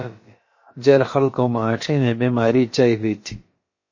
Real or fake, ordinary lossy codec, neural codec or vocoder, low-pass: fake; MP3, 32 kbps; codec, 16 kHz, about 1 kbps, DyCAST, with the encoder's durations; 7.2 kHz